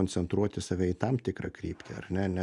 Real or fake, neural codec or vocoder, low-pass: real; none; 10.8 kHz